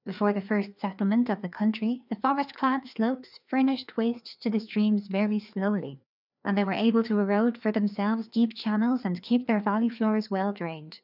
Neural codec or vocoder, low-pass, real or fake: codec, 16 kHz, 2 kbps, FreqCodec, larger model; 5.4 kHz; fake